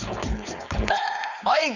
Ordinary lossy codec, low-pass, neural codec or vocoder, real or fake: none; 7.2 kHz; codec, 16 kHz, 4.8 kbps, FACodec; fake